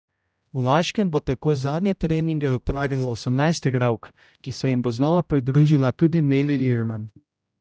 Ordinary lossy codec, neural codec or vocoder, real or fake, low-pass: none; codec, 16 kHz, 0.5 kbps, X-Codec, HuBERT features, trained on general audio; fake; none